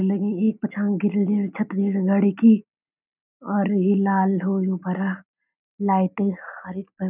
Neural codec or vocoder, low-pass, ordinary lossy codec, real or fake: none; 3.6 kHz; none; real